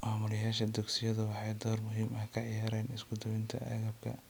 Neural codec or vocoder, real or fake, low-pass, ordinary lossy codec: none; real; none; none